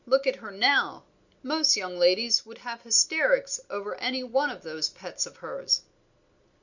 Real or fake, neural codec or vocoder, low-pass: real; none; 7.2 kHz